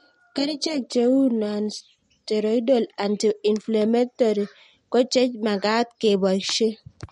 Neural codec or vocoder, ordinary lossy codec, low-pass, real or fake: none; MP3, 48 kbps; 10.8 kHz; real